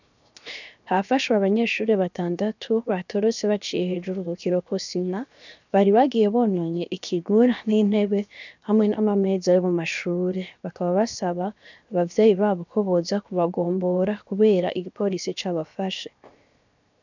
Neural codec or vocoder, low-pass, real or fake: codec, 16 kHz, 0.7 kbps, FocalCodec; 7.2 kHz; fake